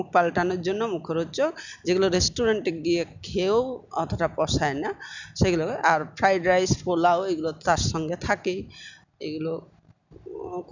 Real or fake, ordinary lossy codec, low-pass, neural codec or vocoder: real; none; 7.2 kHz; none